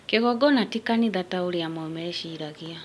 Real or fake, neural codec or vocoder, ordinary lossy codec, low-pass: real; none; none; none